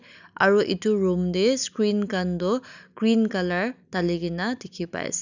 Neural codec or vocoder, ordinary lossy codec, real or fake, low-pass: none; none; real; 7.2 kHz